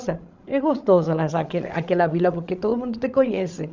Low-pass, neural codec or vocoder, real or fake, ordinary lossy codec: 7.2 kHz; codec, 16 kHz, 16 kbps, FreqCodec, larger model; fake; Opus, 64 kbps